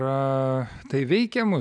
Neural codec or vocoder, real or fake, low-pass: none; real; 9.9 kHz